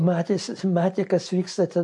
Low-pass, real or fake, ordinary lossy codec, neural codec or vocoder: 10.8 kHz; real; MP3, 48 kbps; none